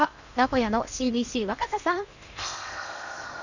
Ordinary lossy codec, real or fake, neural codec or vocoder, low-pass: none; fake; codec, 16 kHz in and 24 kHz out, 0.8 kbps, FocalCodec, streaming, 65536 codes; 7.2 kHz